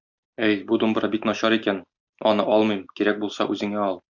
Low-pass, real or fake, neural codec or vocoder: 7.2 kHz; real; none